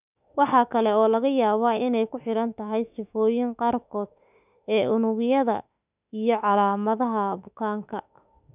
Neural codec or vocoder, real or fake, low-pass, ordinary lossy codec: autoencoder, 48 kHz, 32 numbers a frame, DAC-VAE, trained on Japanese speech; fake; 3.6 kHz; none